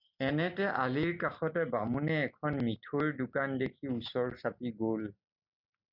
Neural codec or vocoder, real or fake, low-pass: none; real; 5.4 kHz